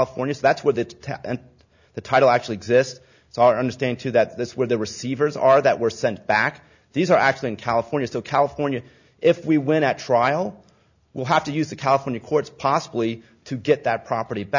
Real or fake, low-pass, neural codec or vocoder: real; 7.2 kHz; none